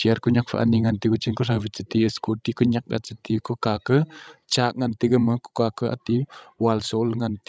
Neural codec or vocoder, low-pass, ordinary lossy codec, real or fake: codec, 16 kHz, 16 kbps, FreqCodec, larger model; none; none; fake